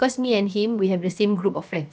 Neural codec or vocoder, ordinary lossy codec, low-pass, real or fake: codec, 16 kHz, about 1 kbps, DyCAST, with the encoder's durations; none; none; fake